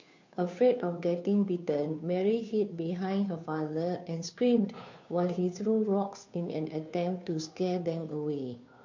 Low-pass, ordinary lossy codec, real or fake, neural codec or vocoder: 7.2 kHz; MP3, 48 kbps; fake; codec, 16 kHz, 2 kbps, FunCodec, trained on Chinese and English, 25 frames a second